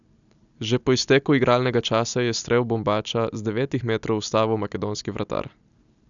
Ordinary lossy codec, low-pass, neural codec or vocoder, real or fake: none; 7.2 kHz; none; real